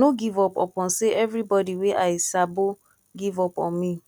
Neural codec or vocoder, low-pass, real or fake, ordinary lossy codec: none; 19.8 kHz; real; none